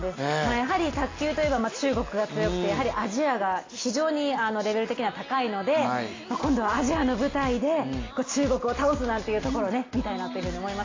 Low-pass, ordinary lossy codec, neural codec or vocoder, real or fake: 7.2 kHz; AAC, 32 kbps; none; real